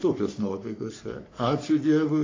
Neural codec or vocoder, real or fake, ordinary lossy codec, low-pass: codec, 44.1 kHz, 3.4 kbps, Pupu-Codec; fake; AAC, 32 kbps; 7.2 kHz